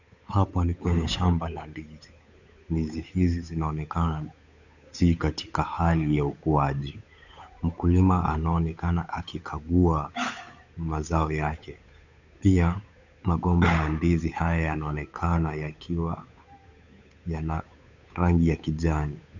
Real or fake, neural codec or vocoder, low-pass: fake; codec, 16 kHz, 8 kbps, FunCodec, trained on Chinese and English, 25 frames a second; 7.2 kHz